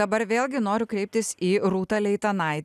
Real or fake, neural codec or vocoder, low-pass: real; none; 14.4 kHz